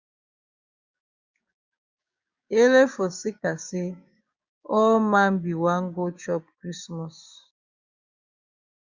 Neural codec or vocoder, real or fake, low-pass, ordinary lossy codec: codec, 16 kHz, 6 kbps, DAC; fake; 7.2 kHz; Opus, 64 kbps